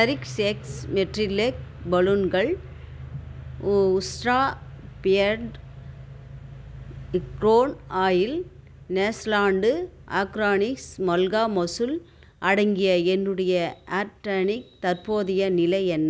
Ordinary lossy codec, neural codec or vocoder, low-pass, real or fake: none; none; none; real